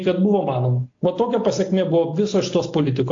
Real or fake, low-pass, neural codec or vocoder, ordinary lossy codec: real; 7.2 kHz; none; AAC, 48 kbps